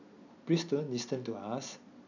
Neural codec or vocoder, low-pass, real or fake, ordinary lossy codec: none; 7.2 kHz; real; none